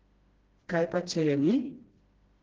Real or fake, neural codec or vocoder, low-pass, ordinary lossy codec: fake; codec, 16 kHz, 1 kbps, FreqCodec, smaller model; 7.2 kHz; Opus, 16 kbps